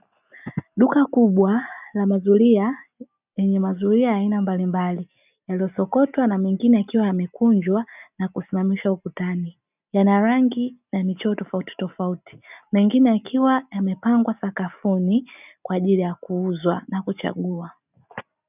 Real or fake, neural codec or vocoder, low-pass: real; none; 3.6 kHz